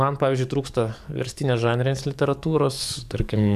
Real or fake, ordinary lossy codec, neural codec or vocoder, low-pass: fake; AAC, 96 kbps; codec, 44.1 kHz, 7.8 kbps, DAC; 14.4 kHz